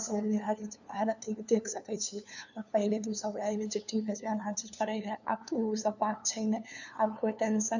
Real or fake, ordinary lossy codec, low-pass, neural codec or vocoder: fake; none; 7.2 kHz; codec, 16 kHz, 2 kbps, FunCodec, trained on LibriTTS, 25 frames a second